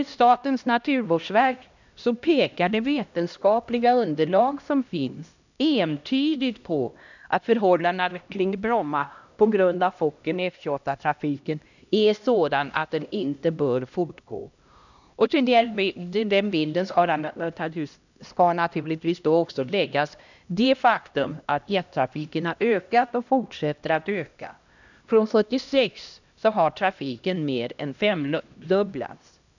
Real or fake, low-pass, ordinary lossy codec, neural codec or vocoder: fake; 7.2 kHz; none; codec, 16 kHz, 1 kbps, X-Codec, HuBERT features, trained on LibriSpeech